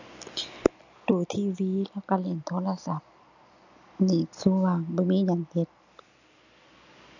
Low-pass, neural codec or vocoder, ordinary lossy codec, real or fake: 7.2 kHz; none; none; real